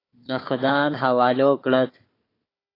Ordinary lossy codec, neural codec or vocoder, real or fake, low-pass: AAC, 24 kbps; codec, 16 kHz, 4 kbps, FunCodec, trained on Chinese and English, 50 frames a second; fake; 5.4 kHz